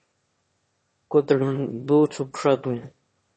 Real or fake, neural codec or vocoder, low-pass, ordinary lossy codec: fake; autoencoder, 22.05 kHz, a latent of 192 numbers a frame, VITS, trained on one speaker; 9.9 kHz; MP3, 32 kbps